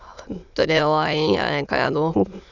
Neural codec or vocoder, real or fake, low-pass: autoencoder, 22.05 kHz, a latent of 192 numbers a frame, VITS, trained on many speakers; fake; 7.2 kHz